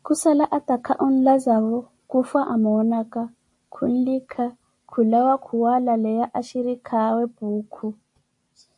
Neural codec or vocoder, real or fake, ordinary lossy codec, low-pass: none; real; MP3, 48 kbps; 10.8 kHz